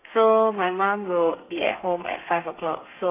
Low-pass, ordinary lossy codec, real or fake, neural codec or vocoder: 3.6 kHz; AAC, 24 kbps; fake; codec, 32 kHz, 1.9 kbps, SNAC